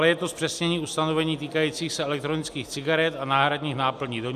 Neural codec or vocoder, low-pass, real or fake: none; 14.4 kHz; real